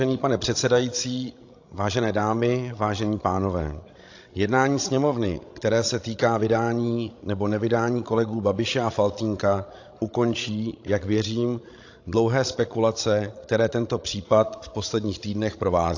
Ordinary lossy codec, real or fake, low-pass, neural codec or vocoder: AAC, 48 kbps; fake; 7.2 kHz; codec, 16 kHz, 16 kbps, FreqCodec, larger model